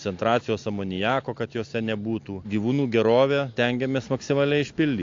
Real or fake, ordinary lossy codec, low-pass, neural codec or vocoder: real; AAC, 48 kbps; 7.2 kHz; none